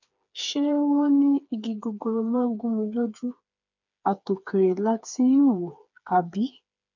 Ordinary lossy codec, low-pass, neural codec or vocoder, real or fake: none; 7.2 kHz; codec, 16 kHz, 4 kbps, FreqCodec, smaller model; fake